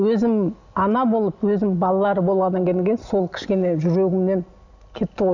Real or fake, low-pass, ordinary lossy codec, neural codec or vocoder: real; 7.2 kHz; none; none